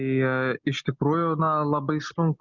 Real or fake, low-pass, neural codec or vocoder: real; 7.2 kHz; none